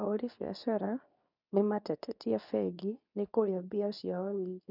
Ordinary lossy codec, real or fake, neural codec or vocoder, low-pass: none; fake; codec, 24 kHz, 0.9 kbps, WavTokenizer, medium speech release version 2; 5.4 kHz